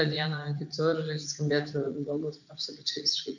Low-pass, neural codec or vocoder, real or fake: 7.2 kHz; vocoder, 44.1 kHz, 80 mel bands, Vocos; fake